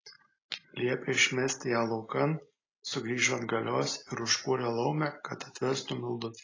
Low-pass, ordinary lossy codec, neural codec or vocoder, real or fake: 7.2 kHz; AAC, 32 kbps; none; real